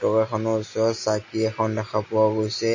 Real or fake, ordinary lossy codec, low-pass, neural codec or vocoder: real; MP3, 32 kbps; 7.2 kHz; none